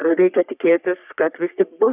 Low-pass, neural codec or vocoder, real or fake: 3.6 kHz; codec, 44.1 kHz, 3.4 kbps, Pupu-Codec; fake